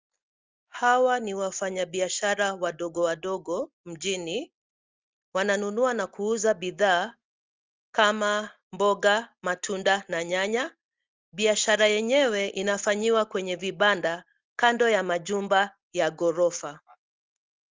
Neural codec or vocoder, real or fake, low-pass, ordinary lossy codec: none; real; 7.2 kHz; Opus, 32 kbps